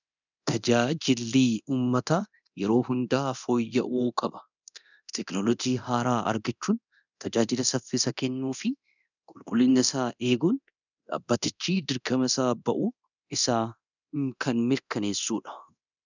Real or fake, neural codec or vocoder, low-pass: fake; codec, 24 kHz, 0.9 kbps, DualCodec; 7.2 kHz